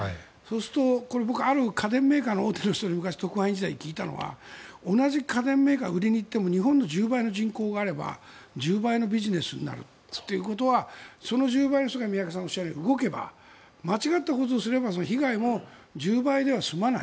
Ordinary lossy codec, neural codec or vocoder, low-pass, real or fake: none; none; none; real